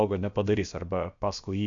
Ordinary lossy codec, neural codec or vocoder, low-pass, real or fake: MP3, 64 kbps; codec, 16 kHz, about 1 kbps, DyCAST, with the encoder's durations; 7.2 kHz; fake